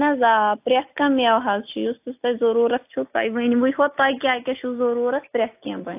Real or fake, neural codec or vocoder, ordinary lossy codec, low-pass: real; none; AAC, 32 kbps; 3.6 kHz